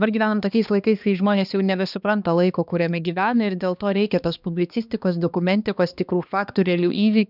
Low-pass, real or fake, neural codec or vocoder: 5.4 kHz; fake; codec, 16 kHz, 2 kbps, X-Codec, HuBERT features, trained on balanced general audio